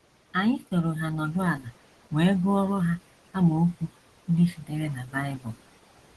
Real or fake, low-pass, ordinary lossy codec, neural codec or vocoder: real; 10.8 kHz; Opus, 16 kbps; none